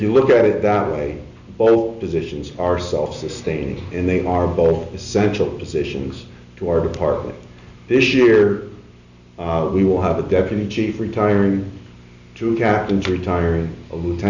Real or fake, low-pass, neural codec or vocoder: real; 7.2 kHz; none